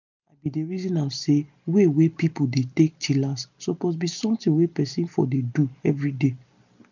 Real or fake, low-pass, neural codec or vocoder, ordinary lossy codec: real; 7.2 kHz; none; none